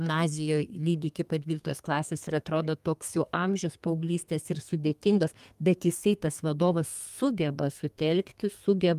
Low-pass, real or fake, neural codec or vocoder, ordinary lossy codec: 14.4 kHz; fake; codec, 32 kHz, 1.9 kbps, SNAC; Opus, 32 kbps